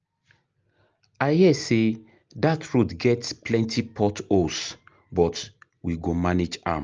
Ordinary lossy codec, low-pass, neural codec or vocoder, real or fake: Opus, 24 kbps; 7.2 kHz; none; real